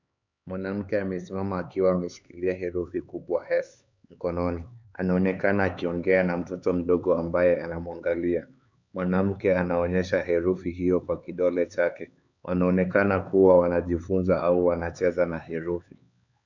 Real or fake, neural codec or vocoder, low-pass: fake; codec, 16 kHz, 4 kbps, X-Codec, HuBERT features, trained on LibriSpeech; 7.2 kHz